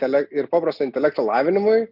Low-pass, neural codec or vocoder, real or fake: 5.4 kHz; none; real